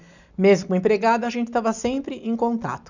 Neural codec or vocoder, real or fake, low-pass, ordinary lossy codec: none; real; 7.2 kHz; none